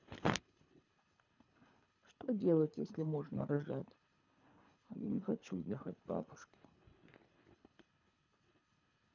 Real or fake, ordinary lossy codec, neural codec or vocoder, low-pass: fake; none; codec, 24 kHz, 3 kbps, HILCodec; 7.2 kHz